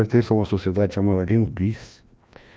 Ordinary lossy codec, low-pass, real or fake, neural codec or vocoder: none; none; fake; codec, 16 kHz, 1 kbps, FreqCodec, larger model